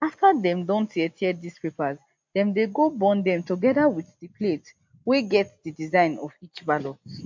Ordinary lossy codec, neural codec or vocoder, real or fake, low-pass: MP3, 48 kbps; none; real; 7.2 kHz